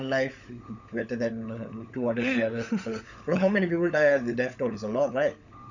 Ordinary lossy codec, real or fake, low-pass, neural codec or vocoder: none; fake; 7.2 kHz; codec, 16 kHz, 16 kbps, FunCodec, trained on LibriTTS, 50 frames a second